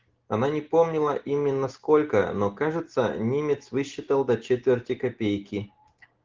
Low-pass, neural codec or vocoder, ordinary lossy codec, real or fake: 7.2 kHz; none; Opus, 16 kbps; real